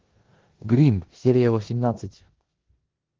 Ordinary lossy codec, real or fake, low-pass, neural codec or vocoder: Opus, 16 kbps; fake; 7.2 kHz; codec, 16 kHz in and 24 kHz out, 0.9 kbps, LongCat-Audio-Codec, four codebook decoder